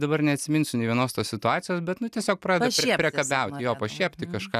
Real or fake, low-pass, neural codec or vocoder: real; 14.4 kHz; none